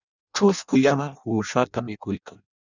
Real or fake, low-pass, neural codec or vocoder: fake; 7.2 kHz; codec, 16 kHz in and 24 kHz out, 0.6 kbps, FireRedTTS-2 codec